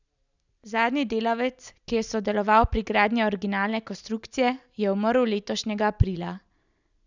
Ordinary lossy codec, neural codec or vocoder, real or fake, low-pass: none; none; real; 7.2 kHz